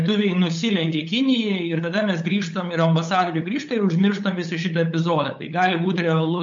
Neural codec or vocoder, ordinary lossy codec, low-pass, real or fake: codec, 16 kHz, 8 kbps, FunCodec, trained on LibriTTS, 25 frames a second; MP3, 48 kbps; 7.2 kHz; fake